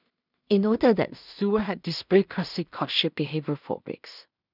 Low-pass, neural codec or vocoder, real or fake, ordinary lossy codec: 5.4 kHz; codec, 16 kHz in and 24 kHz out, 0.4 kbps, LongCat-Audio-Codec, two codebook decoder; fake; none